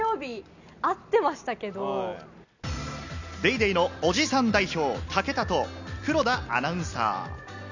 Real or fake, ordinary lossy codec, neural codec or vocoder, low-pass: real; none; none; 7.2 kHz